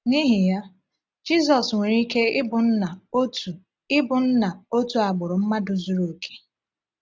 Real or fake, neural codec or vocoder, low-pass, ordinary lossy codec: real; none; none; none